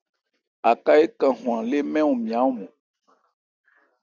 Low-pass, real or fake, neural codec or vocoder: 7.2 kHz; real; none